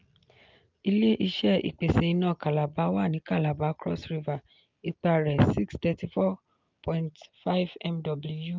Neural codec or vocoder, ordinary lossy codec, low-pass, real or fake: none; Opus, 24 kbps; 7.2 kHz; real